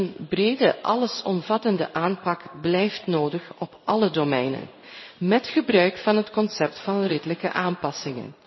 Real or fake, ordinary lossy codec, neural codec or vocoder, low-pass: fake; MP3, 24 kbps; codec, 16 kHz in and 24 kHz out, 1 kbps, XY-Tokenizer; 7.2 kHz